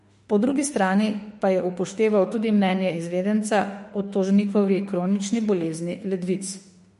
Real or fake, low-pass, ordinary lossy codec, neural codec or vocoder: fake; 14.4 kHz; MP3, 48 kbps; autoencoder, 48 kHz, 32 numbers a frame, DAC-VAE, trained on Japanese speech